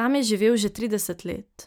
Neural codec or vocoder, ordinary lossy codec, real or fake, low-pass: none; none; real; none